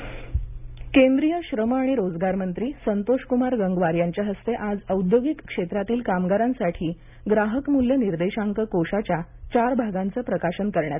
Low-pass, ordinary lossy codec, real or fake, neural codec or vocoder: 3.6 kHz; none; real; none